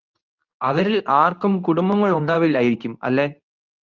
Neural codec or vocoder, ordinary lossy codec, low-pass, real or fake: codec, 24 kHz, 0.9 kbps, WavTokenizer, medium speech release version 2; Opus, 24 kbps; 7.2 kHz; fake